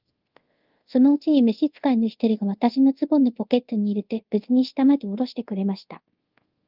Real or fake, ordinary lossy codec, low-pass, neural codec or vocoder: fake; Opus, 32 kbps; 5.4 kHz; codec, 24 kHz, 0.5 kbps, DualCodec